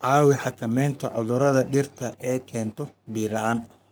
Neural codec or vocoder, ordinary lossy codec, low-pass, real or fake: codec, 44.1 kHz, 3.4 kbps, Pupu-Codec; none; none; fake